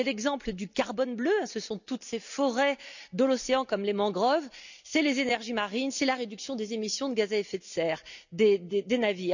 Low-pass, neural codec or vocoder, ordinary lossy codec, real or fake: 7.2 kHz; none; none; real